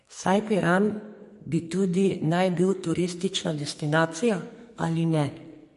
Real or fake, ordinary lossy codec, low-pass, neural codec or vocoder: fake; MP3, 48 kbps; 14.4 kHz; codec, 44.1 kHz, 2.6 kbps, SNAC